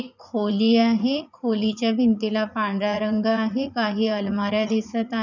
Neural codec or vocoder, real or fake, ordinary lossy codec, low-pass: vocoder, 44.1 kHz, 80 mel bands, Vocos; fake; none; 7.2 kHz